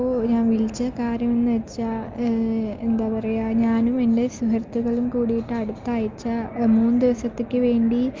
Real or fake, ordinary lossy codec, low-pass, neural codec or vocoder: real; Opus, 32 kbps; 7.2 kHz; none